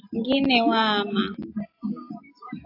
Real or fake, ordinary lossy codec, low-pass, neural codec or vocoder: real; AAC, 32 kbps; 5.4 kHz; none